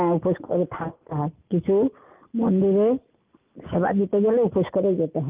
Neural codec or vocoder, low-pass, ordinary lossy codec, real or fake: none; 3.6 kHz; Opus, 32 kbps; real